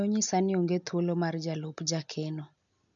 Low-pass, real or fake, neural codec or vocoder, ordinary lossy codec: 7.2 kHz; real; none; none